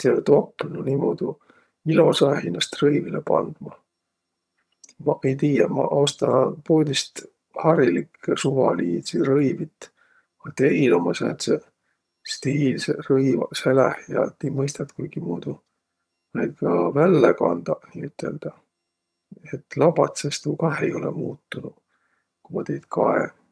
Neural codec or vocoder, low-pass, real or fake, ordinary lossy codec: vocoder, 22.05 kHz, 80 mel bands, HiFi-GAN; none; fake; none